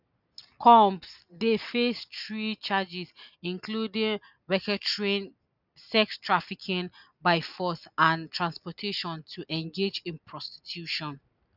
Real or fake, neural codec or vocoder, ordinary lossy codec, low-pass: real; none; none; 5.4 kHz